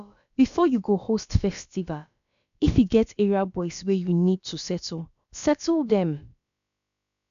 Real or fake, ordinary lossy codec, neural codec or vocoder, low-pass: fake; none; codec, 16 kHz, about 1 kbps, DyCAST, with the encoder's durations; 7.2 kHz